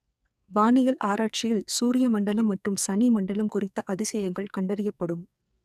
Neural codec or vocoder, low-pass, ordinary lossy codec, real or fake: codec, 44.1 kHz, 2.6 kbps, SNAC; 14.4 kHz; none; fake